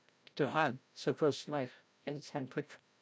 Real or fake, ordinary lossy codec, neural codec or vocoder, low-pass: fake; none; codec, 16 kHz, 0.5 kbps, FreqCodec, larger model; none